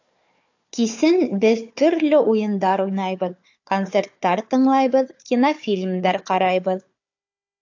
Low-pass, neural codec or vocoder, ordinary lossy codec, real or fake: 7.2 kHz; codec, 16 kHz, 4 kbps, FunCodec, trained on Chinese and English, 50 frames a second; AAC, 48 kbps; fake